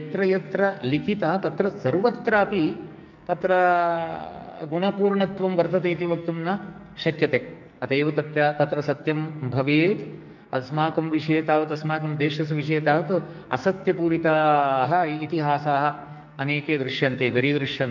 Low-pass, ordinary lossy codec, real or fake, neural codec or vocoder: 7.2 kHz; none; fake; codec, 44.1 kHz, 2.6 kbps, SNAC